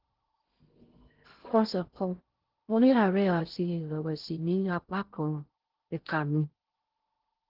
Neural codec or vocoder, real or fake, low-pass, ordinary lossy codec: codec, 16 kHz in and 24 kHz out, 0.6 kbps, FocalCodec, streaming, 2048 codes; fake; 5.4 kHz; Opus, 16 kbps